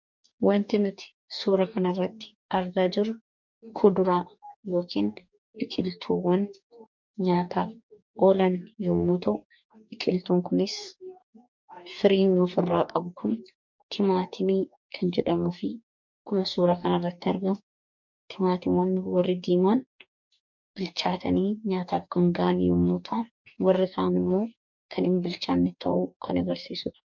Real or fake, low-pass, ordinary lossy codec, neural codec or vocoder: fake; 7.2 kHz; MP3, 64 kbps; codec, 44.1 kHz, 2.6 kbps, DAC